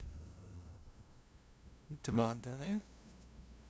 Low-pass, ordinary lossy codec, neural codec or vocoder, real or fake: none; none; codec, 16 kHz, 0.5 kbps, FunCodec, trained on LibriTTS, 25 frames a second; fake